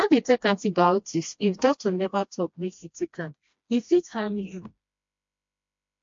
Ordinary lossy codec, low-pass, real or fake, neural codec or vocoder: MP3, 48 kbps; 7.2 kHz; fake; codec, 16 kHz, 1 kbps, FreqCodec, smaller model